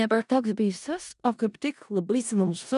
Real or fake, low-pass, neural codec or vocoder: fake; 10.8 kHz; codec, 16 kHz in and 24 kHz out, 0.4 kbps, LongCat-Audio-Codec, four codebook decoder